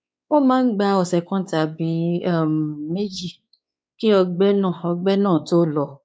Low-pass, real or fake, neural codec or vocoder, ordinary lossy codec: none; fake; codec, 16 kHz, 4 kbps, X-Codec, WavLM features, trained on Multilingual LibriSpeech; none